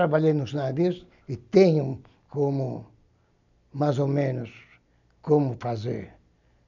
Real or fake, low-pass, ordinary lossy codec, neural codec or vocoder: real; 7.2 kHz; none; none